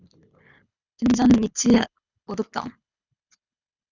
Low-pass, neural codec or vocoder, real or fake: 7.2 kHz; codec, 24 kHz, 6 kbps, HILCodec; fake